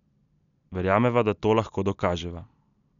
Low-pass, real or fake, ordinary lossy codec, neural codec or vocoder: 7.2 kHz; real; none; none